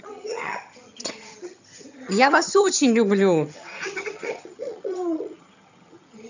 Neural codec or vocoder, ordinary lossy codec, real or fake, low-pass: vocoder, 22.05 kHz, 80 mel bands, HiFi-GAN; none; fake; 7.2 kHz